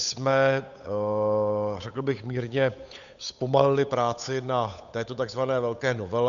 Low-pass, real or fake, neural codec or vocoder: 7.2 kHz; fake; codec, 16 kHz, 8 kbps, FunCodec, trained on Chinese and English, 25 frames a second